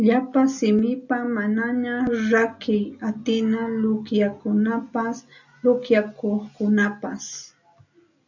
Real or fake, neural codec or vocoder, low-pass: real; none; 7.2 kHz